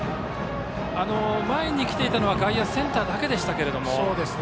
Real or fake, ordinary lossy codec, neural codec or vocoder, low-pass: real; none; none; none